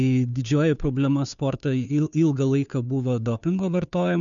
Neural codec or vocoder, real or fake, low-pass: codec, 16 kHz, 2 kbps, FunCodec, trained on Chinese and English, 25 frames a second; fake; 7.2 kHz